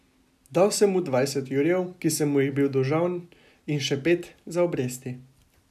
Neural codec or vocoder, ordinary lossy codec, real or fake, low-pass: none; none; real; 14.4 kHz